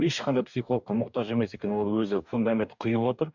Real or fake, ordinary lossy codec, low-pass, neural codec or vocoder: fake; none; 7.2 kHz; codec, 44.1 kHz, 2.6 kbps, DAC